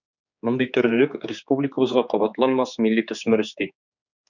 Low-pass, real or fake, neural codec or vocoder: 7.2 kHz; fake; codec, 16 kHz, 4 kbps, X-Codec, HuBERT features, trained on general audio